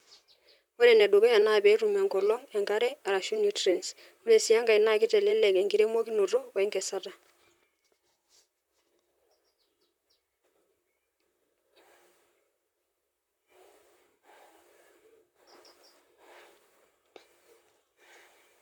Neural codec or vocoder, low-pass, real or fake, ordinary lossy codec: vocoder, 44.1 kHz, 128 mel bands, Pupu-Vocoder; 19.8 kHz; fake; MP3, 96 kbps